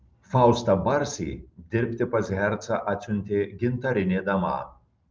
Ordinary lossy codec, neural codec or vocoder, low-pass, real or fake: Opus, 32 kbps; none; 7.2 kHz; real